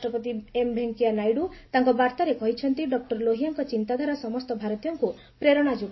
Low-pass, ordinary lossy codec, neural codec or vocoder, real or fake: 7.2 kHz; MP3, 24 kbps; none; real